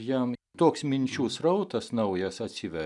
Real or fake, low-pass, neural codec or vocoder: real; 10.8 kHz; none